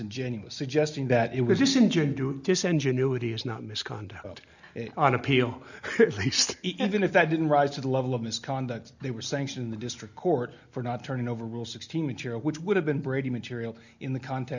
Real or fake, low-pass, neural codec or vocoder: real; 7.2 kHz; none